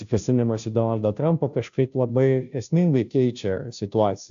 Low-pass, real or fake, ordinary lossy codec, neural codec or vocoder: 7.2 kHz; fake; AAC, 64 kbps; codec, 16 kHz, 0.5 kbps, FunCodec, trained on Chinese and English, 25 frames a second